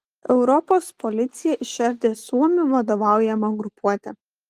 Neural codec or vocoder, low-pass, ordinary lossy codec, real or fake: none; 14.4 kHz; Opus, 24 kbps; real